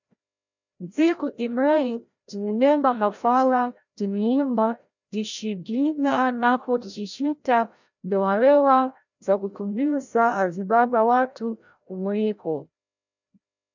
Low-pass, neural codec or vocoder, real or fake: 7.2 kHz; codec, 16 kHz, 0.5 kbps, FreqCodec, larger model; fake